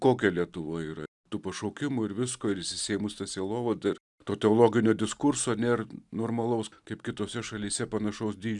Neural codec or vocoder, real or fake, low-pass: none; real; 10.8 kHz